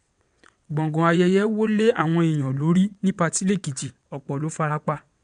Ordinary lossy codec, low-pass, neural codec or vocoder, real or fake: none; 9.9 kHz; vocoder, 22.05 kHz, 80 mel bands, WaveNeXt; fake